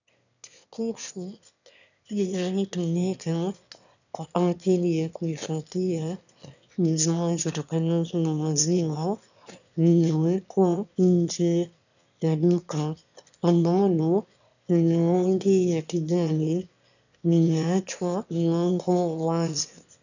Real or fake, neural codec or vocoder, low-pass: fake; autoencoder, 22.05 kHz, a latent of 192 numbers a frame, VITS, trained on one speaker; 7.2 kHz